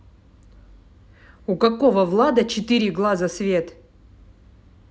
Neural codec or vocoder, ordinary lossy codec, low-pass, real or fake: none; none; none; real